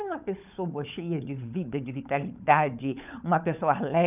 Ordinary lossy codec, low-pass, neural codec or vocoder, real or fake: none; 3.6 kHz; codec, 16 kHz, 16 kbps, FunCodec, trained on LibriTTS, 50 frames a second; fake